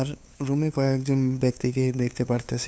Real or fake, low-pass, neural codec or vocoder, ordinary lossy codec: fake; none; codec, 16 kHz, 2 kbps, FunCodec, trained on LibriTTS, 25 frames a second; none